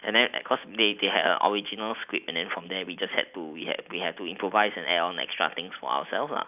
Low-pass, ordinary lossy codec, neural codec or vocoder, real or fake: 3.6 kHz; none; none; real